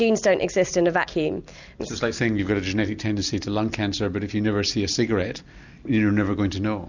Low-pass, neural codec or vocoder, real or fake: 7.2 kHz; none; real